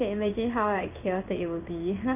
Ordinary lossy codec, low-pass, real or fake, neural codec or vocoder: none; 3.6 kHz; fake; codec, 16 kHz in and 24 kHz out, 1 kbps, XY-Tokenizer